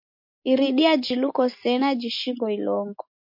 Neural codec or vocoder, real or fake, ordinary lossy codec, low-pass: none; real; MP3, 32 kbps; 5.4 kHz